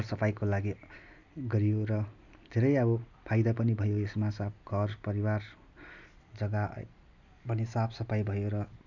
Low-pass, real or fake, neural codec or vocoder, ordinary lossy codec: 7.2 kHz; real; none; none